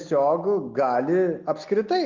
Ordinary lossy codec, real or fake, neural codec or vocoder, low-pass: Opus, 24 kbps; real; none; 7.2 kHz